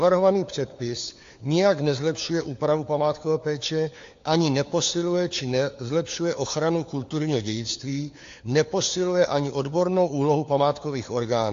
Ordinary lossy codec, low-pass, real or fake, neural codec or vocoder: AAC, 48 kbps; 7.2 kHz; fake; codec, 16 kHz, 4 kbps, FunCodec, trained on LibriTTS, 50 frames a second